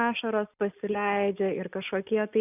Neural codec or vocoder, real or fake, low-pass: none; real; 3.6 kHz